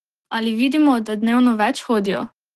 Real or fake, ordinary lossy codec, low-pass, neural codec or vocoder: fake; Opus, 16 kbps; 14.4 kHz; autoencoder, 48 kHz, 128 numbers a frame, DAC-VAE, trained on Japanese speech